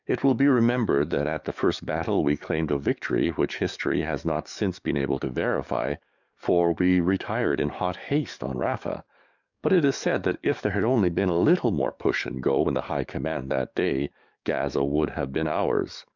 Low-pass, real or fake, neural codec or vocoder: 7.2 kHz; fake; codec, 44.1 kHz, 7.8 kbps, DAC